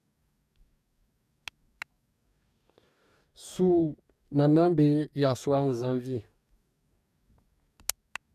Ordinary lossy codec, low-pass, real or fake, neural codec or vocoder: none; 14.4 kHz; fake; codec, 44.1 kHz, 2.6 kbps, DAC